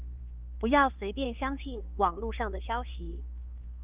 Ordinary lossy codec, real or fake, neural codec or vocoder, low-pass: Opus, 32 kbps; fake; codec, 16 kHz, 4 kbps, X-Codec, HuBERT features, trained on LibriSpeech; 3.6 kHz